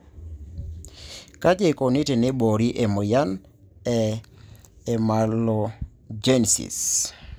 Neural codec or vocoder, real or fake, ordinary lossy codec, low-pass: none; real; none; none